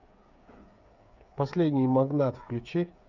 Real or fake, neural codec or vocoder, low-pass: fake; codec, 16 kHz, 8 kbps, FreqCodec, smaller model; 7.2 kHz